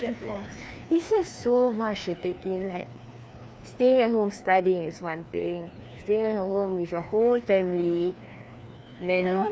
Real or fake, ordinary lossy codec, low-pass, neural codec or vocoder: fake; none; none; codec, 16 kHz, 2 kbps, FreqCodec, larger model